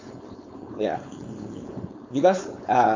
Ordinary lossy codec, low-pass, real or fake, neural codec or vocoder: MP3, 48 kbps; 7.2 kHz; fake; codec, 16 kHz, 4.8 kbps, FACodec